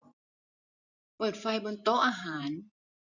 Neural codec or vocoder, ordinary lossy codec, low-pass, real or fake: vocoder, 44.1 kHz, 128 mel bands every 512 samples, BigVGAN v2; none; 7.2 kHz; fake